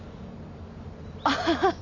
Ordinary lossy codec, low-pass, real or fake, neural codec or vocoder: MP3, 64 kbps; 7.2 kHz; real; none